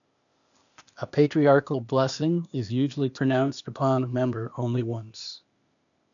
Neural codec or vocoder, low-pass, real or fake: codec, 16 kHz, 0.8 kbps, ZipCodec; 7.2 kHz; fake